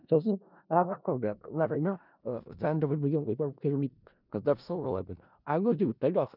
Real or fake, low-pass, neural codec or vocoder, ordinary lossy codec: fake; 5.4 kHz; codec, 16 kHz in and 24 kHz out, 0.4 kbps, LongCat-Audio-Codec, four codebook decoder; none